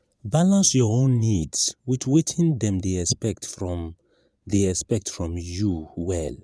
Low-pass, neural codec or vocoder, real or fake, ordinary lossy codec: none; vocoder, 22.05 kHz, 80 mel bands, Vocos; fake; none